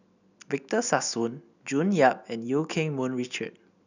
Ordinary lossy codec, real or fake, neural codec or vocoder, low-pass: none; real; none; 7.2 kHz